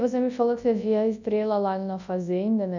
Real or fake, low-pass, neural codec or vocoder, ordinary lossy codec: fake; 7.2 kHz; codec, 24 kHz, 0.9 kbps, WavTokenizer, large speech release; none